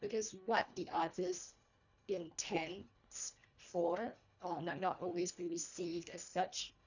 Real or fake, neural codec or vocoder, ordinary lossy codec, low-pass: fake; codec, 24 kHz, 1.5 kbps, HILCodec; none; 7.2 kHz